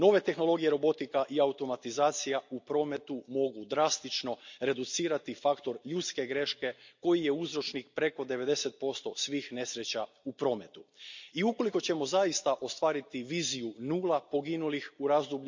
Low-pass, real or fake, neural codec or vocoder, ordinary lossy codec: 7.2 kHz; real; none; MP3, 64 kbps